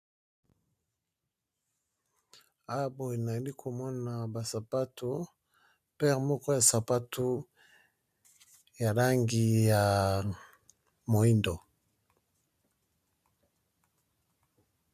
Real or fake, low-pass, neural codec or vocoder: real; 14.4 kHz; none